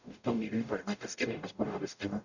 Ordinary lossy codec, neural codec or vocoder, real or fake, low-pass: none; codec, 44.1 kHz, 0.9 kbps, DAC; fake; 7.2 kHz